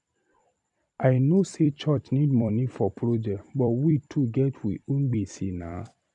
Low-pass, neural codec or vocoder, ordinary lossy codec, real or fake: 10.8 kHz; vocoder, 44.1 kHz, 128 mel bands every 256 samples, BigVGAN v2; none; fake